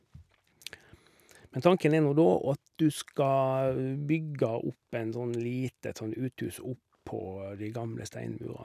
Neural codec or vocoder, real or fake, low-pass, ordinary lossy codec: none; real; 14.4 kHz; none